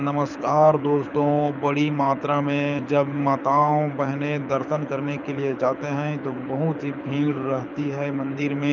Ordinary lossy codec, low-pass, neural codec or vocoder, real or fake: none; 7.2 kHz; codec, 24 kHz, 6 kbps, HILCodec; fake